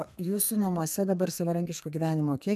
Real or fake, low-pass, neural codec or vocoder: fake; 14.4 kHz; codec, 32 kHz, 1.9 kbps, SNAC